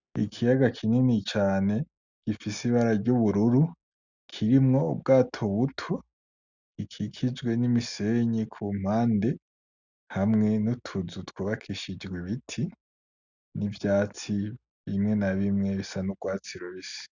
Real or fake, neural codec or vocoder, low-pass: real; none; 7.2 kHz